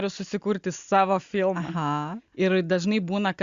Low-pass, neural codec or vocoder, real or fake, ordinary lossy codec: 7.2 kHz; none; real; Opus, 64 kbps